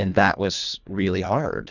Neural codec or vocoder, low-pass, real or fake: codec, 24 kHz, 1.5 kbps, HILCodec; 7.2 kHz; fake